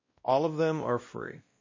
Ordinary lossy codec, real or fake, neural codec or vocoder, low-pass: MP3, 32 kbps; fake; codec, 16 kHz, 1 kbps, X-Codec, WavLM features, trained on Multilingual LibriSpeech; 7.2 kHz